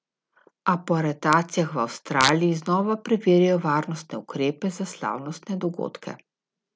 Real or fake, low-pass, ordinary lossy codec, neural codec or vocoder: real; none; none; none